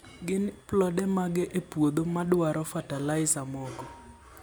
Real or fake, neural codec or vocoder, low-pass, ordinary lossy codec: real; none; none; none